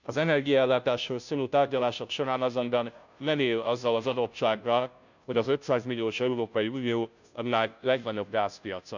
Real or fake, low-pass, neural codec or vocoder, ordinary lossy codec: fake; 7.2 kHz; codec, 16 kHz, 0.5 kbps, FunCodec, trained on Chinese and English, 25 frames a second; none